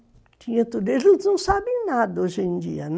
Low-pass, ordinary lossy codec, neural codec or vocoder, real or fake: none; none; none; real